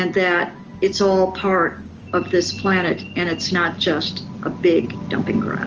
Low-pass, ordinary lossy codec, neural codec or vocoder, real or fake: 7.2 kHz; Opus, 24 kbps; none; real